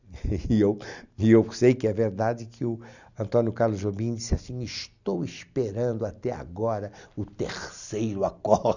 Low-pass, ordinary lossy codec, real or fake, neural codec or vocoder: 7.2 kHz; none; real; none